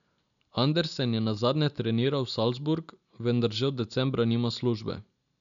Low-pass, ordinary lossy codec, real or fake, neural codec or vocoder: 7.2 kHz; none; real; none